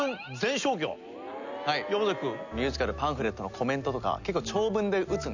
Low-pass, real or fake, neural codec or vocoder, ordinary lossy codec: 7.2 kHz; real; none; none